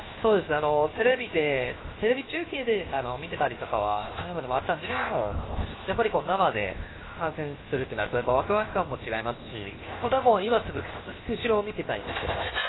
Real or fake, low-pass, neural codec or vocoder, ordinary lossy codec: fake; 7.2 kHz; codec, 16 kHz, 0.7 kbps, FocalCodec; AAC, 16 kbps